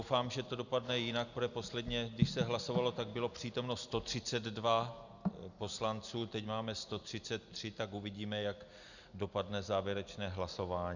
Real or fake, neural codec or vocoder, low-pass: real; none; 7.2 kHz